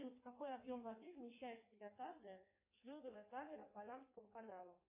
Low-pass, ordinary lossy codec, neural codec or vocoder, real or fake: 3.6 kHz; MP3, 24 kbps; codec, 16 kHz in and 24 kHz out, 1.1 kbps, FireRedTTS-2 codec; fake